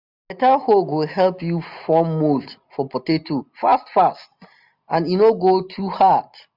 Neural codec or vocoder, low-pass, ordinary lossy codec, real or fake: none; 5.4 kHz; none; real